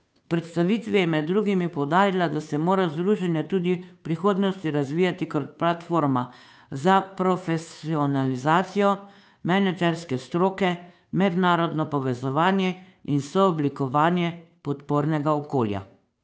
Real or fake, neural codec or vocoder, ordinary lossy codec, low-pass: fake; codec, 16 kHz, 2 kbps, FunCodec, trained on Chinese and English, 25 frames a second; none; none